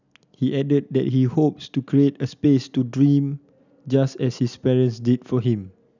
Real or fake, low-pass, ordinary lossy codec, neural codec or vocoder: real; 7.2 kHz; none; none